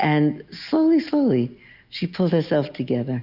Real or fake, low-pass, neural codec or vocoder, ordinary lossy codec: real; 5.4 kHz; none; Opus, 64 kbps